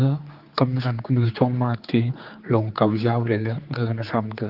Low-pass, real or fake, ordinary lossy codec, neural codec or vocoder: 5.4 kHz; fake; Opus, 32 kbps; codec, 16 kHz, 4 kbps, X-Codec, HuBERT features, trained on general audio